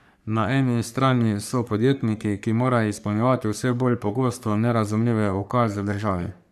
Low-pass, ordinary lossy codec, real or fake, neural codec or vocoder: 14.4 kHz; none; fake; codec, 44.1 kHz, 3.4 kbps, Pupu-Codec